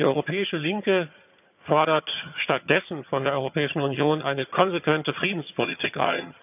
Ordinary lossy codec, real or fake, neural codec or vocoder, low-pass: none; fake; vocoder, 22.05 kHz, 80 mel bands, HiFi-GAN; 3.6 kHz